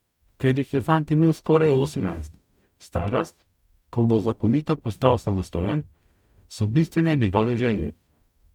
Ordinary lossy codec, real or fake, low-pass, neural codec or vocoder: none; fake; 19.8 kHz; codec, 44.1 kHz, 0.9 kbps, DAC